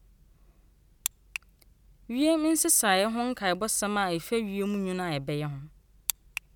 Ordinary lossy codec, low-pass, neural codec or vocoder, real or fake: none; 19.8 kHz; none; real